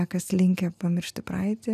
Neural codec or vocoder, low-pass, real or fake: none; 14.4 kHz; real